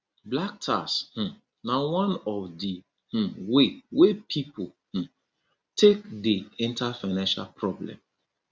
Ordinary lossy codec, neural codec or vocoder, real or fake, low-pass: none; none; real; none